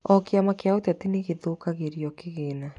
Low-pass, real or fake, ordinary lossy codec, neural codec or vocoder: 10.8 kHz; real; none; none